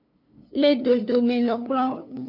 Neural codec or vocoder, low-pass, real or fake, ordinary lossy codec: codec, 16 kHz, 2 kbps, FunCodec, trained on LibriTTS, 25 frames a second; 5.4 kHz; fake; AAC, 32 kbps